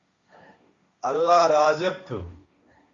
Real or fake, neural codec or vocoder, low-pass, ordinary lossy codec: fake; codec, 16 kHz, 1.1 kbps, Voila-Tokenizer; 7.2 kHz; Opus, 64 kbps